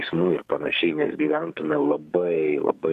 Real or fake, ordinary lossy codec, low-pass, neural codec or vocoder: fake; MP3, 64 kbps; 14.4 kHz; codec, 44.1 kHz, 2.6 kbps, SNAC